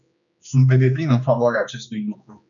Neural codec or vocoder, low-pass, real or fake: codec, 16 kHz, 2 kbps, X-Codec, HuBERT features, trained on general audio; 7.2 kHz; fake